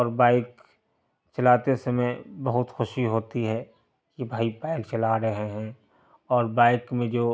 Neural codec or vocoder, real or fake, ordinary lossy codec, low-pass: none; real; none; none